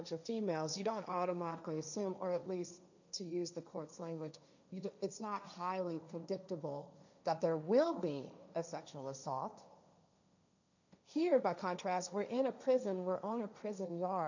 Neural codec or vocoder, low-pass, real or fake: codec, 16 kHz, 1.1 kbps, Voila-Tokenizer; 7.2 kHz; fake